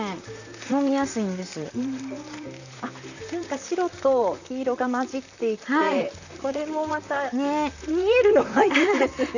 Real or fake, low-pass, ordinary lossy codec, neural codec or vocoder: fake; 7.2 kHz; none; vocoder, 44.1 kHz, 128 mel bands, Pupu-Vocoder